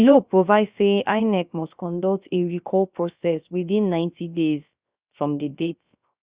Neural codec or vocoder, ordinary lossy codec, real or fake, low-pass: codec, 16 kHz, 0.3 kbps, FocalCodec; Opus, 64 kbps; fake; 3.6 kHz